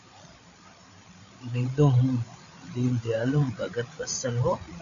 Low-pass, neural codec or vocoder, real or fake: 7.2 kHz; codec, 16 kHz, 8 kbps, FreqCodec, larger model; fake